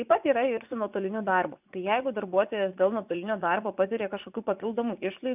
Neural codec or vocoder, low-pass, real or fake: vocoder, 24 kHz, 100 mel bands, Vocos; 3.6 kHz; fake